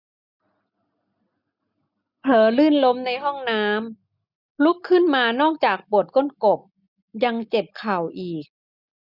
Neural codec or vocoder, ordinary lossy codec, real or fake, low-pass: none; none; real; 5.4 kHz